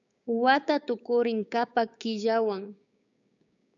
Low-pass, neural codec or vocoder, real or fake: 7.2 kHz; codec, 16 kHz, 6 kbps, DAC; fake